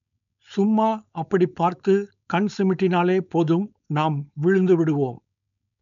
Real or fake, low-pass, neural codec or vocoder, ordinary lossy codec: fake; 7.2 kHz; codec, 16 kHz, 4.8 kbps, FACodec; none